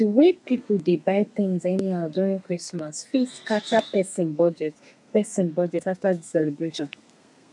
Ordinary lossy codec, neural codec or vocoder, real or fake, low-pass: none; codec, 32 kHz, 1.9 kbps, SNAC; fake; 10.8 kHz